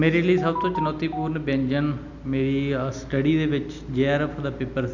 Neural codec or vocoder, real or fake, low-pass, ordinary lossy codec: none; real; 7.2 kHz; none